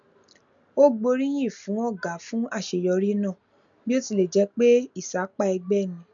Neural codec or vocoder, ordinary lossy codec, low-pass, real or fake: none; none; 7.2 kHz; real